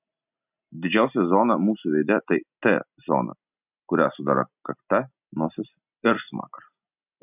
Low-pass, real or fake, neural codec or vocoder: 3.6 kHz; real; none